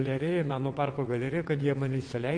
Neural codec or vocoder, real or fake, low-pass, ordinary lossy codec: vocoder, 22.05 kHz, 80 mel bands, WaveNeXt; fake; 9.9 kHz; MP3, 48 kbps